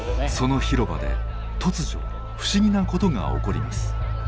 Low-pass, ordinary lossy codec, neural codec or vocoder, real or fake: none; none; none; real